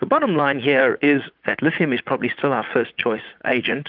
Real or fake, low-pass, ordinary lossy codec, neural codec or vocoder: fake; 5.4 kHz; Opus, 32 kbps; vocoder, 44.1 kHz, 80 mel bands, Vocos